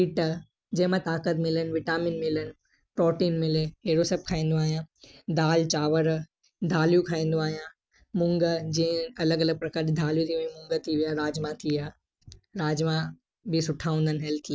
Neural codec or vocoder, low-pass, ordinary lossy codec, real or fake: none; none; none; real